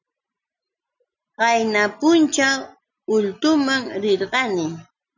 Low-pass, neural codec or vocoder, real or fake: 7.2 kHz; none; real